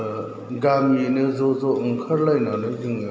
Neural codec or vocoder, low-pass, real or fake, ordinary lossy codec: none; none; real; none